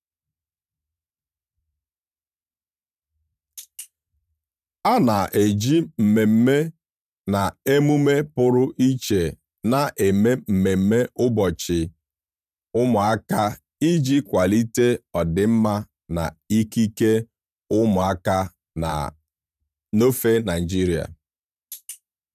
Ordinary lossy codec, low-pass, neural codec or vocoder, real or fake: none; 14.4 kHz; vocoder, 44.1 kHz, 128 mel bands every 512 samples, BigVGAN v2; fake